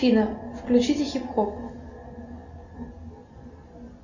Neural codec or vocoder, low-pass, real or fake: none; 7.2 kHz; real